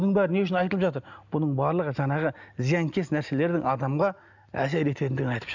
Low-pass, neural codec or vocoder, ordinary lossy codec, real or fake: 7.2 kHz; none; none; real